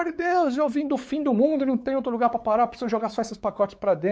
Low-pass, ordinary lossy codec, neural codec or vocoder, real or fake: none; none; codec, 16 kHz, 4 kbps, X-Codec, WavLM features, trained on Multilingual LibriSpeech; fake